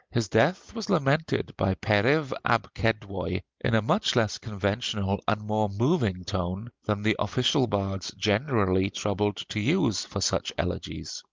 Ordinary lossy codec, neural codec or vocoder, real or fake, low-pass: Opus, 32 kbps; none; real; 7.2 kHz